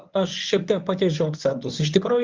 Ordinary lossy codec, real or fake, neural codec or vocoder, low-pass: Opus, 32 kbps; fake; codec, 24 kHz, 0.9 kbps, WavTokenizer, medium speech release version 1; 7.2 kHz